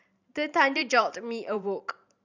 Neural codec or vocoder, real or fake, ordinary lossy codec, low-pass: vocoder, 44.1 kHz, 128 mel bands every 512 samples, BigVGAN v2; fake; none; 7.2 kHz